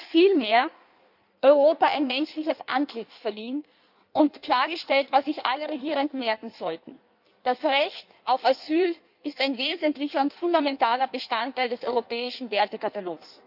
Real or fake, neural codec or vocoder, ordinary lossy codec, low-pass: fake; codec, 16 kHz in and 24 kHz out, 1.1 kbps, FireRedTTS-2 codec; none; 5.4 kHz